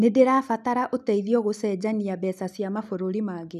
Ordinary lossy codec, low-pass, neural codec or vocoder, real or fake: none; 14.4 kHz; none; real